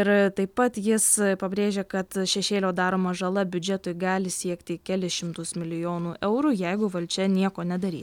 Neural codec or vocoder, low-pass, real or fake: none; 19.8 kHz; real